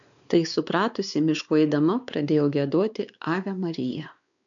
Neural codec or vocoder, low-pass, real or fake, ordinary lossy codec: codec, 16 kHz, 4 kbps, X-Codec, WavLM features, trained on Multilingual LibriSpeech; 7.2 kHz; fake; MP3, 96 kbps